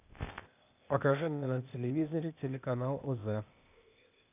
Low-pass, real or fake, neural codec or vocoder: 3.6 kHz; fake; codec, 16 kHz, 0.8 kbps, ZipCodec